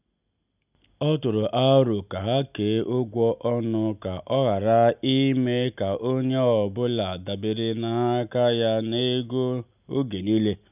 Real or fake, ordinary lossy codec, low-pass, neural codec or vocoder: real; none; 3.6 kHz; none